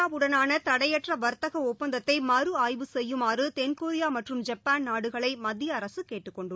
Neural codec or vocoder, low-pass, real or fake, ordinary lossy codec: none; none; real; none